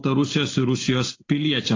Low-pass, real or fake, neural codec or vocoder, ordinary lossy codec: 7.2 kHz; real; none; AAC, 32 kbps